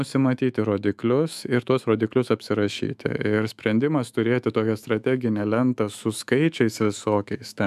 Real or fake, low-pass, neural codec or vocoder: fake; 14.4 kHz; autoencoder, 48 kHz, 128 numbers a frame, DAC-VAE, trained on Japanese speech